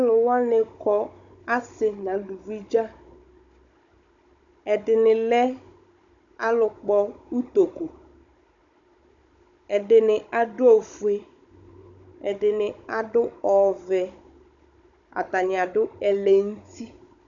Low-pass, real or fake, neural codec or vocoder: 7.2 kHz; fake; codec, 16 kHz, 16 kbps, FunCodec, trained on Chinese and English, 50 frames a second